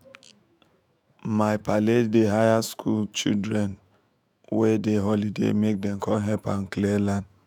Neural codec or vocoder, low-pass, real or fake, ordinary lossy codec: autoencoder, 48 kHz, 128 numbers a frame, DAC-VAE, trained on Japanese speech; 19.8 kHz; fake; none